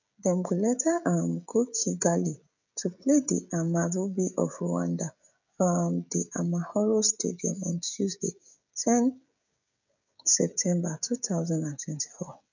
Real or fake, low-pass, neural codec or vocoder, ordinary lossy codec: fake; 7.2 kHz; codec, 16 kHz, 16 kbps, FreqCodec, smaller model; none